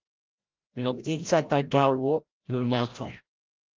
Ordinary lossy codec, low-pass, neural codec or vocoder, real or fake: Opus, 32 kbps; 7.2 kHz; codec, 16 kHz, 0.5 kbps, FreqCodec, larger model; fake